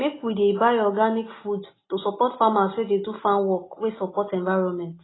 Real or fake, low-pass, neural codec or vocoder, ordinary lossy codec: real; 7.2 kHz; none; AAC, 16 kbps